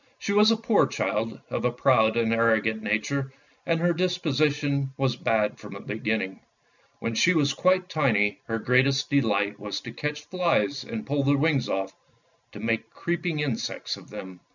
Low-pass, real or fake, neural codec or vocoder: 7.2 kHz; real; none